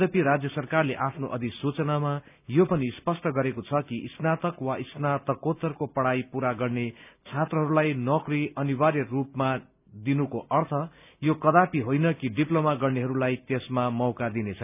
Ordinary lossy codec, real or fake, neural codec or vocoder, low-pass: none; real; none; 3.6 kHz